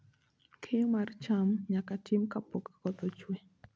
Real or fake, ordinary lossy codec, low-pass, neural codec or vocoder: real; none; none; none